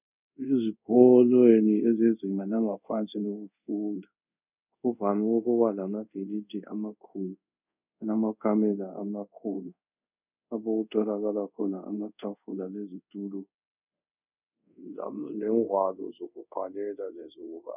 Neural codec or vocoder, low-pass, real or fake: codec, 24 kHz, 0.5 kbps, DualCodec; 3.6 kHz; fake